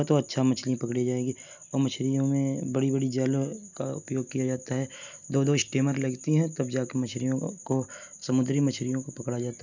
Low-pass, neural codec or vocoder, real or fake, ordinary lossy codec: 7.2 kHz; none; real; none